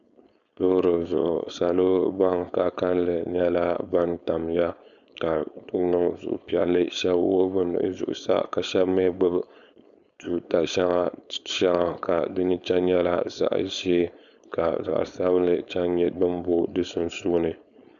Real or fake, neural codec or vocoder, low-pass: fake; codec, 16 kHz, 4.8 kbps, FACodec; 7.2 kHz